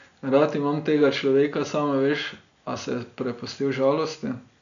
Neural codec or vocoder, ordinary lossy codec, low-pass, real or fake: none; none; 7.2 kHz; real